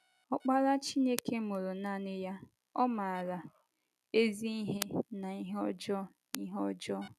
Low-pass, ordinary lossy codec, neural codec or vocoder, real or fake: 14.4 kHz; none; none; real